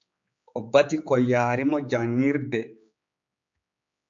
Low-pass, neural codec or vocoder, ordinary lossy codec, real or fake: 7.2 kHz; codec, 16 kHz, 4 kbps, X-Codec, HuBERT features, trained on general audio; MP3, 48 kbps; fake